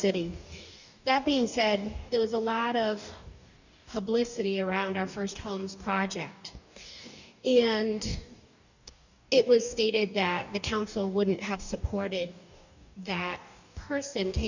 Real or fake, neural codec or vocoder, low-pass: fake; codec, 44.1 kHz, 2.6 kbps, DAC; 7.2 kHz